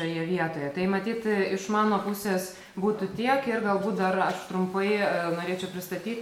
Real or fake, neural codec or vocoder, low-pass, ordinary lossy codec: real; none; 19.8 kHz; MP3, 96 kbps